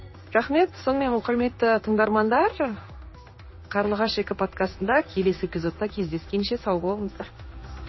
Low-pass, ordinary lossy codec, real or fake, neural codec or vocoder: 7.2 kHz; MP3, 24 kbps; fake; codec, 16 kHz in and 24 kHz out, 1 kbps, XY-Tokenizer